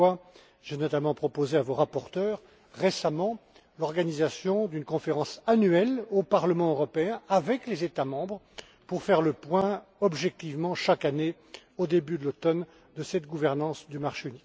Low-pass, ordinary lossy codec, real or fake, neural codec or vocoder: none; none; real; none